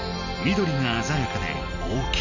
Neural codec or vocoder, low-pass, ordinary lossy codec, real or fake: none; 7.2 kHz; none; real